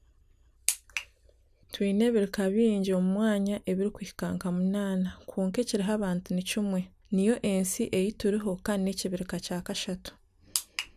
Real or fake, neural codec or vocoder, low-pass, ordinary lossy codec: real; none; 14.4 kHz; none